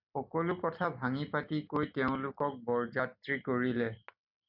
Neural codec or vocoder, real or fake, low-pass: none; real; 5.4 kHz